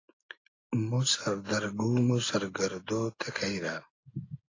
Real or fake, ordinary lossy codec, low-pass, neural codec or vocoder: real; AAC, 32 kbps; 7.2 kHz; none